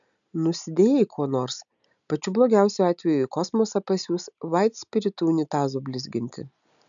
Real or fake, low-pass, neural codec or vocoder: real; 7.2 kHz; none